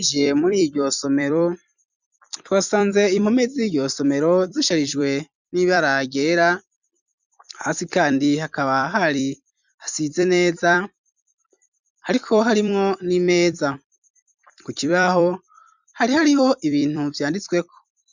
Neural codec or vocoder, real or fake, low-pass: vocoder, 44.1 kHz, 128 mel bands every 256 samples, BigVGAN v2; fake; 7.2 kHz